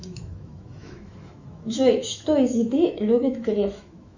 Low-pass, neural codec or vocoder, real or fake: 7.2 kHz; autoencoder, 48 kHz, 128 numbers a frame, DAC-VAE, trained on Japanese speech; fake